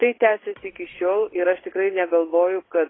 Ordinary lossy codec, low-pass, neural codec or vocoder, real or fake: AAC, 16 kbps; 7.2 kHz; vocoder, 22.05 kHz, 80 mel bands, Vocos; fake